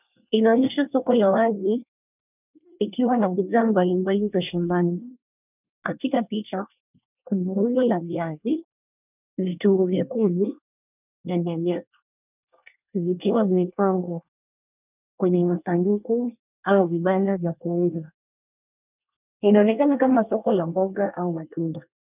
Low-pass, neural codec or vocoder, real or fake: 3.6 kHz; codec, 24 kHz, 1 kbps, SNAC; fake